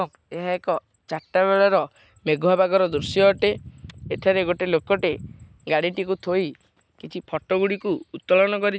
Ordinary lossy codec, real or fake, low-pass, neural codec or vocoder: none; real; none; none